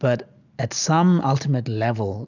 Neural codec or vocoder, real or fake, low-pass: none; real; 7.2 kHz